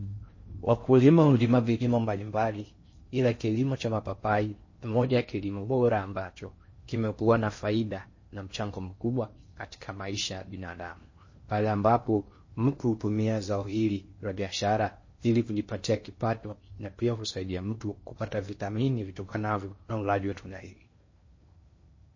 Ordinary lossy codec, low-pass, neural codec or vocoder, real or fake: MP3, 32 kbps; 7.2 kHz; codec, 16 kHz in and 24 kHz out, 0.6 kbps, FocalCodec, streaming, 4096 codes; fake